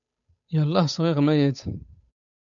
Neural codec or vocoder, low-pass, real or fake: codec, 16 kHz, 8 kbps, FunCodec, trained on Chinese and English, 25 frames a second; 7.2 kHz; fake